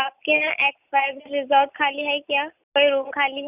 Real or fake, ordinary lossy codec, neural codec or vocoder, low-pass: real; none; none; 3.6 kHz